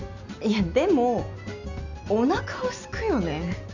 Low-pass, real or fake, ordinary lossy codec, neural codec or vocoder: 7.2 kHz; real; none; none